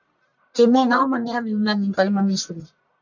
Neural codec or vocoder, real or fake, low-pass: codec, 44.1 kHz, 1.7 kbps, Pupu-Codec; fake; 7.2 kHz